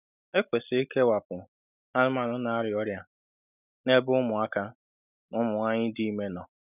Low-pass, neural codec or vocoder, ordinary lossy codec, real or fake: 3.6 kHz; none; none; real